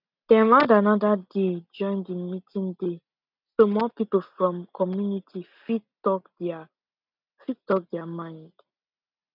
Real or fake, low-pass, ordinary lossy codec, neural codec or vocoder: real; 5.4 kHz; none; none